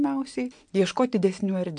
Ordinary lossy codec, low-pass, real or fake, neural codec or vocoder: MP3, 64 kbps; 9.9 kHz; real; none